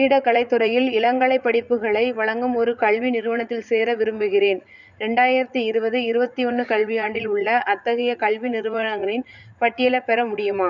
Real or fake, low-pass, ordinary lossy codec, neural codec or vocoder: fake; 7.2 kHz; none; vocoder, 44.1 kHz, 80 mel bands, Vocos